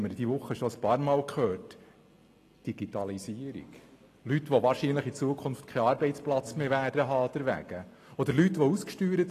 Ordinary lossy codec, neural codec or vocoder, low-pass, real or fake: AAC, 48 kbps; none; 14.4 kHz; real